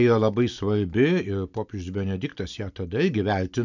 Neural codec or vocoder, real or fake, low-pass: none; real; 7.2 kHz